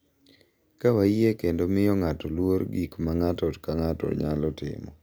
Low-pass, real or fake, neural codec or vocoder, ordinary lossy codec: none; real; none; none